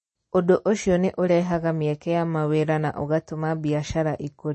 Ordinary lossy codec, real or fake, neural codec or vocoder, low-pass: MP3, 32 kbps; real; none; 10.8 kHz